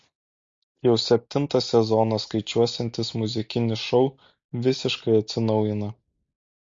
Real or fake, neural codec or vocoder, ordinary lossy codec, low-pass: real; none; MP3, 48 kbps; 7.2 kHz